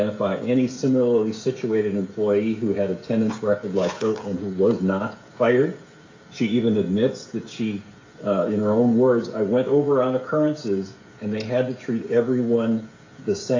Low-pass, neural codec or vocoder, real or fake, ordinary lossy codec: 7.2 kHz; codec, 16 kHz, 16 kbps, FreqCodec, smaller model; fake; AAC, 32 kbps